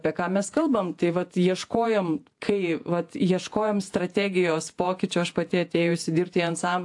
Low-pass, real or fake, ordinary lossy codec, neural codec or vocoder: 10.8 kHz; fake; AAC, 64 kbps; vocoder, 48 kHz, 128 mel bands, Vocos